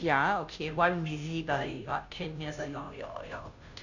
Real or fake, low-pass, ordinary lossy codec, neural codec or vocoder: fake; 7.2 kHz; none; codec, 16 kHz, 0.5 kbps, FunCodec, trained on Chinese and English, 25 frames a second